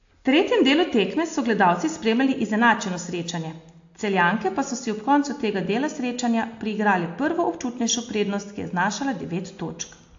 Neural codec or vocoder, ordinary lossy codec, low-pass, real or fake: none; AAC, 48 kbps; 7.2 kHz; real